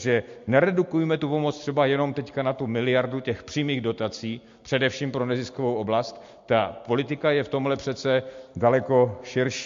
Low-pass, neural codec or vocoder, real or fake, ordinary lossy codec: 7.2 kHz; none; real; MP3, 48 kbps